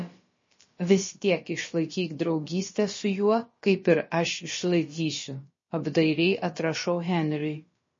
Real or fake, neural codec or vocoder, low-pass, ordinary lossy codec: fake; codec, 16 kHz, about 1 kbps, DyCAST, with the encoder's durations; 7.2 kHz; MP3, 32 kbps